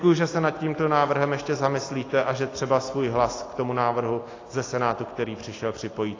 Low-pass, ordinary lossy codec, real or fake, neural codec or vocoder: 7.2 kHz; AAC, 32 kbps; real; none